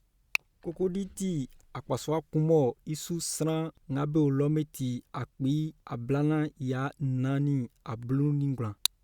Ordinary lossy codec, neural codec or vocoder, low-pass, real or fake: Opus, 64 kbps; none; 19.8 kHz; real